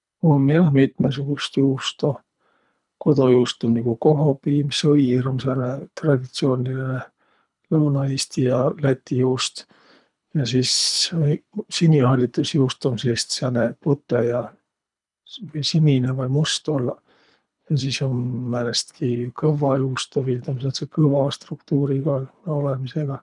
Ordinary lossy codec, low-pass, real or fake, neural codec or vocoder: none; 10.8 kHz; fake; codec, 24 kHz, 3 kbps, HILCodec